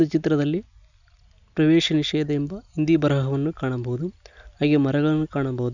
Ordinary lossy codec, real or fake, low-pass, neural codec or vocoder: none; real; 7.2 kHz; none